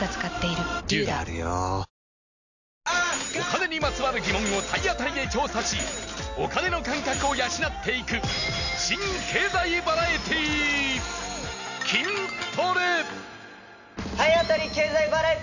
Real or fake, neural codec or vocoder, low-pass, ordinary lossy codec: real; none; 7.2 kHz; none